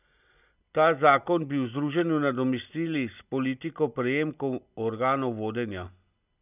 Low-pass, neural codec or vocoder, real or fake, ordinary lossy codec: 3.6 kHz; none; real; none